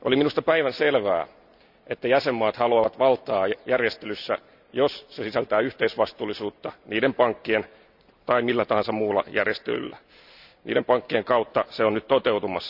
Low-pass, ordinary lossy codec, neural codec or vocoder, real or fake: 5.4 kHz; none; none; real